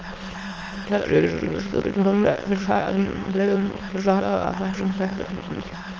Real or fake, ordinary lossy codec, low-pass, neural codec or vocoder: fake; Opus, 24 kbps; 7.2 kHz; autoencoder, 22.05 kHz, a latent of 192 numbers a frame, VITS, trained on many speakers